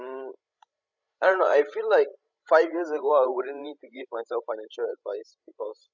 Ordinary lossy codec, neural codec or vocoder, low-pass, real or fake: none; codec, 16 kHz, 16 kbps, FreqCodec, larger model; 7.2 kHz; fake